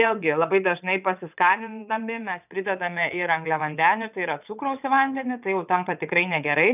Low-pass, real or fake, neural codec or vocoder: 3.6 kHz; fake; vocoder, 22.05 kHz, 80 mel bands, WaveNeXt